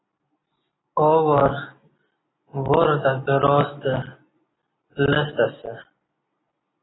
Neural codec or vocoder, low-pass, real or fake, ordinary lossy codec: none; 7.2 kHz; real; AAC, 16 kbps